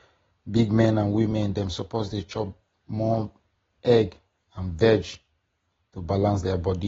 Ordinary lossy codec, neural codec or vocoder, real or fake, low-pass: AAC, 24 kbps; none; real; 7.2 kHz